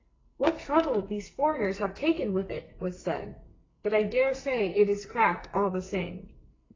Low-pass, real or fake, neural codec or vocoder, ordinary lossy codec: 7.2 kHz; fake; codec, 44.1 kHz, 2.6 kbps, SNAC; AAC, 32 kbps